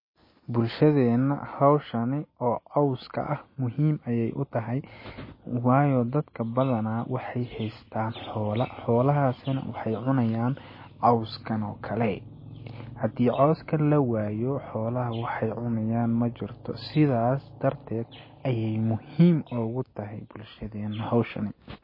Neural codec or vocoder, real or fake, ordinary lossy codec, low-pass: none; real; MP3, 24 kbps; 5.4 kHz